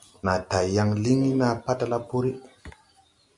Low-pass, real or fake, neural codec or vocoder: 10.8 kHz; real; none